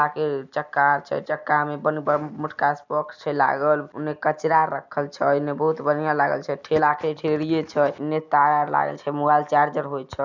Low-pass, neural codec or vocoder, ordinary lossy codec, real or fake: 7.2 kHz; none; none; real